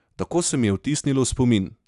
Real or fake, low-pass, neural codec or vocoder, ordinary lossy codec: real; 10.8 kHz; none; none